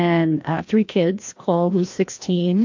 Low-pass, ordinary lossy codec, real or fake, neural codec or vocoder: 7.2 kHz; MP3, 48 kbps; fake; codec, 16 kHz, 1 kbps, FreqCodec, larger model